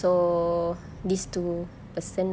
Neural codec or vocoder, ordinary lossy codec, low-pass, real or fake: none; none; none; real